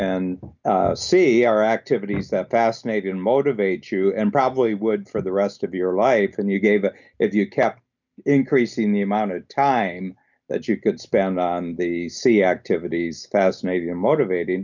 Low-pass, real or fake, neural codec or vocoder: 7.2 kHz; real; none